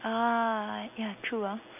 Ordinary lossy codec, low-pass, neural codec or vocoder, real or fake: none; 3.6 kHz; none; real